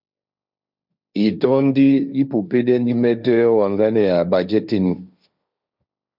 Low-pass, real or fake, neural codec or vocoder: 5.4 kHz; fake; codec, 16 kHz, 1.1 kbps, Voila-Tokenizer